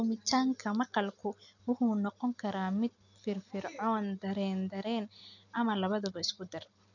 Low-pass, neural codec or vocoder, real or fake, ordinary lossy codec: 7.2 kHz; none; real; none